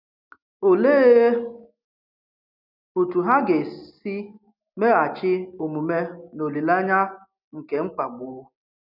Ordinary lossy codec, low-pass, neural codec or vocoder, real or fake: none; 5.4 kHz; none; real